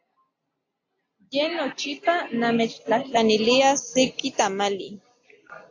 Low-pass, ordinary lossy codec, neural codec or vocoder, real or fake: 7.2 kHz; AAC, 48 kbps; none; real